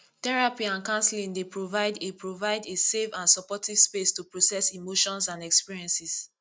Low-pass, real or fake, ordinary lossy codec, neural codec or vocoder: none; real; none; none